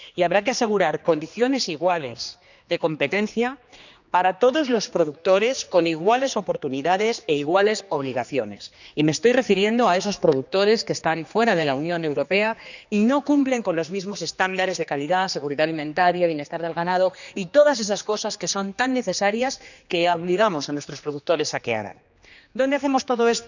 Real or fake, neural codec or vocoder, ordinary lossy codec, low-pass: fake; codec, 16 kHz, 2 kbps, X-Codec, HuBERT features, trained on general audio; none; 7.2 kHz